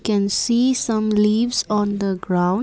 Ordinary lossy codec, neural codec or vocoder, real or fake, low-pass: none; none; real; none